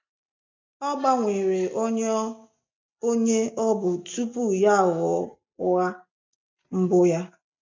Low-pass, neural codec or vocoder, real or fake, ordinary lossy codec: 7.2 kHz; none; real; MP3, 48 kbps